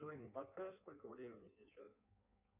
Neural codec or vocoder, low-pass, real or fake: codec, 16 kHz in and 24 kHz out, 1.1 kbps, FireRedTTS-2 codec; 3.6 kHz; fake